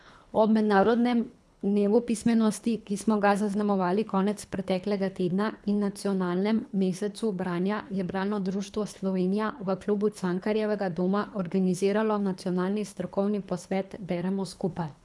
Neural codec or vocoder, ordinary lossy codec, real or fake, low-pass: codec, 24 kHz, 3 kbps, HILCodec; none; fake; none